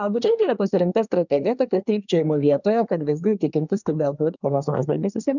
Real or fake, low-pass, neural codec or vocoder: fake; 7.2 kHz; codec, 24 kHz, 1 kbps, SNAC